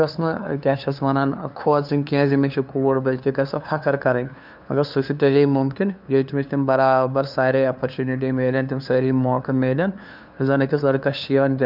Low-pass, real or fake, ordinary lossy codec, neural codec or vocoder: 5.4 kHz; fake; none; codec, 16 kHz, 2 kbps, FunCodec, trained on LibriTTS, 25 frames a second